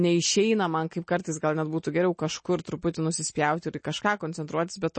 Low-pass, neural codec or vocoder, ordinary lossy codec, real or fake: 9.9 kHz; none; MP3, 32 kbps; real